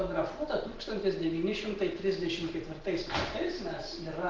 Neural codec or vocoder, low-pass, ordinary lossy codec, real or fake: none; 7.2 kHz; Opus, 16 kbps; real